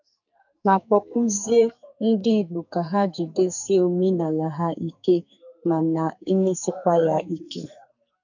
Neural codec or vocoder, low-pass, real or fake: codec, 44.1 kHz, 2.6 kbps, SNAC; 7.2 kHz; fake